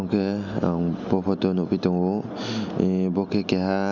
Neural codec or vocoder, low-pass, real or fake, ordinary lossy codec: none; 7.2 kHz; real; none